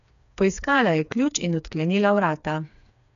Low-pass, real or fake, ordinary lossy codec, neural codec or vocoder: 7.2 kHz; fake; none; codec, 16 kHz, 4 kbps, FreqCodec, smaller model